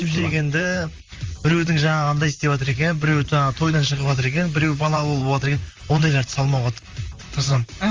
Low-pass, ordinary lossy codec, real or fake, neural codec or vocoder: 7.2 kHz; Opus, 32 kbps; fake; vocoder, 44.1 kHz, 128 mel bands, Pupu-Vocoder